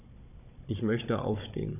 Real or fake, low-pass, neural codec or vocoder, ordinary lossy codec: fake; 3.6 kHz; codec, 16 kHz, 16 kbps, FunCodec, trained on Chinese and English, 50 frames a second; none